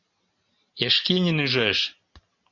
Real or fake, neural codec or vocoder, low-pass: real; none; 7.2 kHz